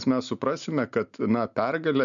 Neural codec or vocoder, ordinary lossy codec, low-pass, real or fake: none; MP3, 96 kbps; 7.2 kHz; real